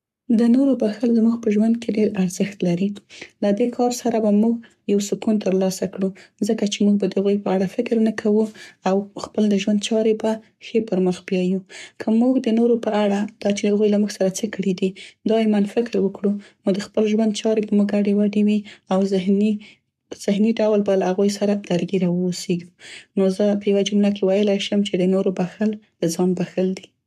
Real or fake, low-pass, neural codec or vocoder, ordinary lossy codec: fake; 14.4 kHz; codec, 44.1 kHz, 7.8 kbps, Pupu-Codec; MP3, 96 kbps